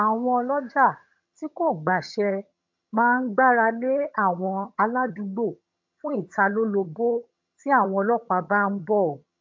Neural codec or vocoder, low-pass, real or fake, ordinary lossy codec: vocoder, 22.05 kHz, 80 mel bands, HiFi-GAN; 7.2 kHz; fake; MP3, 64 kbps